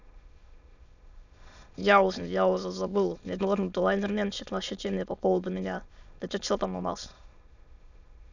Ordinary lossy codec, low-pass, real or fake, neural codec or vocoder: none; 7.2 kHz; fake; autoencoder, 22.05 kHz, a latent of 192 numbers a frame, VITS, trained on many speakers